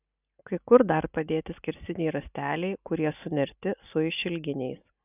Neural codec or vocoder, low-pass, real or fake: none; 3.6 kHz; real